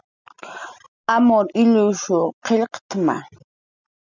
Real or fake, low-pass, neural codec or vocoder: real; 7.2 kHz; none